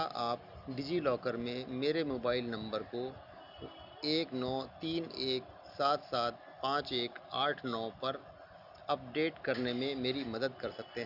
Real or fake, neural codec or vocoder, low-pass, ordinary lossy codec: real; none; 5.4 kHz; none